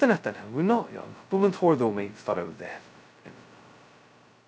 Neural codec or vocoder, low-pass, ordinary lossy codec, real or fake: codec, 16 kHz, 0.2 kbps, FocalCodec; none; none; fake